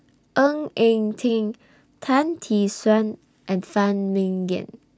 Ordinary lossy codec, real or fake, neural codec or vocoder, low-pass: none; real; none; none